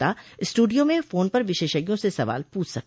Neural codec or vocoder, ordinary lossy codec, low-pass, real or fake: none; none; none; real